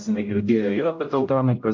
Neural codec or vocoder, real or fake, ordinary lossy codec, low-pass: codec, 16 kHz, 0.5 kbps, X-Codec, HuBERT features, trained on general audio; fake; MP3, 48 kbps; 7.2 kHz